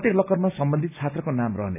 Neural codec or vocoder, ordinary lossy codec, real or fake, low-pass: none; none; real; 3.6 kHz